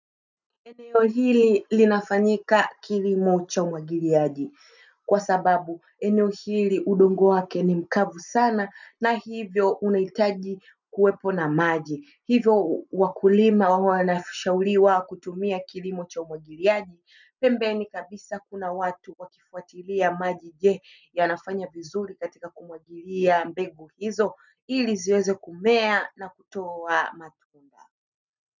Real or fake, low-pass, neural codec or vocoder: real; 7.2 kHz; none